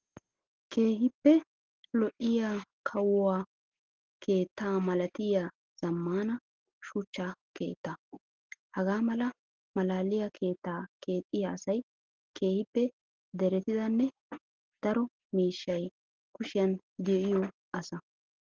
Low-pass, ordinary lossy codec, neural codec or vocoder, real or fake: 7.2 kHz; Opus, 16 kbps; none; real